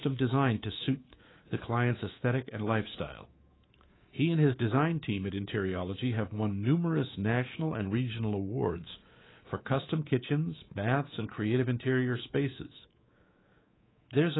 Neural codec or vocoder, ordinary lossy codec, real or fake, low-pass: codec, 24 kHz, 3.1 kbps, DualCodec; AAC, 16 kbps; fake; 7.2 kHz